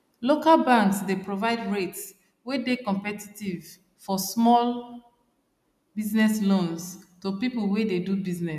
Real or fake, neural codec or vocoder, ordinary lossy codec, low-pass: real; none; none; 14.4 kHz